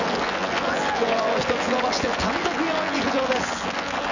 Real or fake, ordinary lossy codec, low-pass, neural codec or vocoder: fake; none; 7.2 kHz; vocoder, 24 kHz, 100 mel bands, Vocos